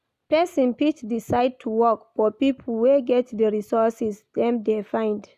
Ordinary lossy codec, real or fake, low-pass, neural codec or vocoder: none; real; 14.4 kHz; none